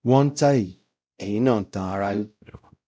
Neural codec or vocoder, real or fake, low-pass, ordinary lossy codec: codec, 16 kHz, 0.5 kbps, X-Codec, WavLM features, trained on Multilingual LibriSpeech; fake; none; none